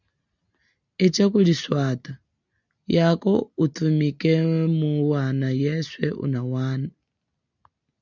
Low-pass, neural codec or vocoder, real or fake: 7.2 kHz; none; real